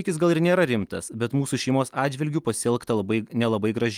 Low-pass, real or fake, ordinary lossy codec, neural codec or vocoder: 19.8 kHz; real; Opus, 32 kbps; none